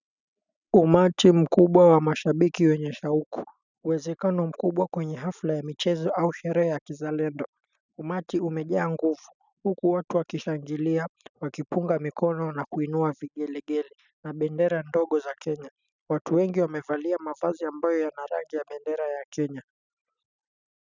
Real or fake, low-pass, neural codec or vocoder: real; 7.2 kHz; none